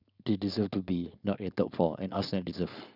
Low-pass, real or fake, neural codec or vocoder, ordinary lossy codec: 5.4 kHz; fake; codec, 16 kHz, 4.8 kbps, FACodec; none